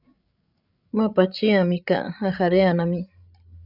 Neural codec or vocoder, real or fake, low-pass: codec, 16 kHz, 16 kbps, FreqCodec, larger model; fake; 5.4 kHz